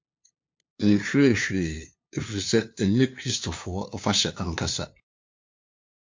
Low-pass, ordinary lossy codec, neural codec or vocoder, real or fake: 7.2 kHz; MP3, 48 kbps; codec, 16 kHz, 2 kbps, FunCodec, trained on LibriTTS, 25 frames a second; fake